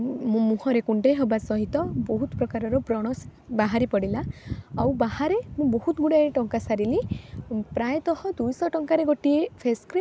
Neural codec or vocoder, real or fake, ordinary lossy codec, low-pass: none; real; none; none